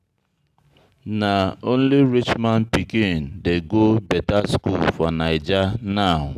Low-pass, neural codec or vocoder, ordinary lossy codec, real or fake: 14.4 kHz; none; none; real